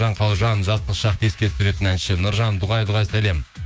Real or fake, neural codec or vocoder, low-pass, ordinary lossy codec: fake; codec, 16 kHz, 6 kbps, DAC; none; none